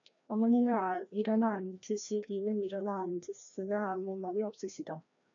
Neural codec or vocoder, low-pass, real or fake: codec, 16 kHz, 1 kbps, FreqCodec, larger model; 7.2 kHz; fake